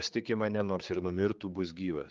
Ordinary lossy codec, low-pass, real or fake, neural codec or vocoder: Opus, 32 kbps; 7.2 kHz; fake; codec, 16 kHz, 4 kbps, X-Codec, HuBERT features, trained on general audio